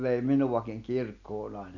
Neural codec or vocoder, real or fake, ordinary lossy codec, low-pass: none; real; none; 7.2 kHz